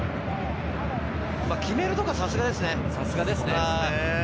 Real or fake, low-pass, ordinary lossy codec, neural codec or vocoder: real; none; none; none